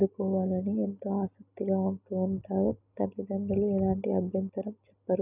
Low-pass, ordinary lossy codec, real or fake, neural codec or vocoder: 3.6 kHz; none; real; none